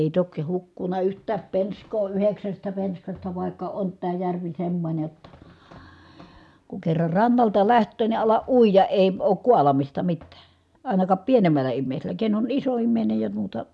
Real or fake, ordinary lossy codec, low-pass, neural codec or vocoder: real; none; none; none